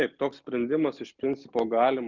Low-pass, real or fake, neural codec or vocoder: 7.2 kHz; real; none